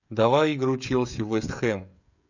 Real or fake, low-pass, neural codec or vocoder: fake; 7.2 kHz; codec, 16 kHz, 16 kbps, FreqCodec, smaller model